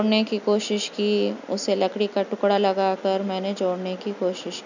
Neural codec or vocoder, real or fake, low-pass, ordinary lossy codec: none; real; 7.2 kHz; none